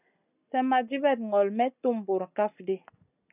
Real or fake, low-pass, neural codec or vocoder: real; 3.6 kHz; none